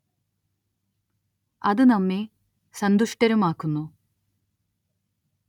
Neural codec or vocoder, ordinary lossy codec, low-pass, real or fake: none; none; 19.8 kHz; real